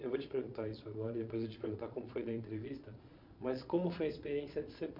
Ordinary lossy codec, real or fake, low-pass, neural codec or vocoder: MP3, 48 kbps; fake; 5.4 kHz; vocoder, 22.05 kHz, 80 mel bands, Vocos